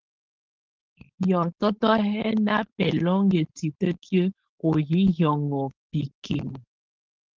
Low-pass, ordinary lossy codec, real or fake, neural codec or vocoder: 7.2 kHz; Opus, 16 kbps; fake; codec, 16 kHz, 4.8 kbps, FACodec